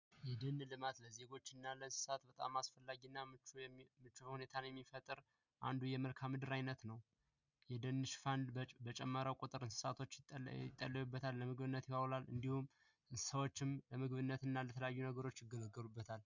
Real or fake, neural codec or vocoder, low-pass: real; none; 7.2 kHz